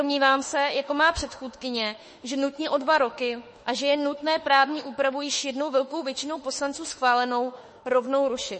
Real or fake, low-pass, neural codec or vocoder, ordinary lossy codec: fake; 10.8 kHz; autoencoder, 48 kHz, 32 numbers a frame, DAC-VAE, trained on Japanese speech; MP3, 32 kbps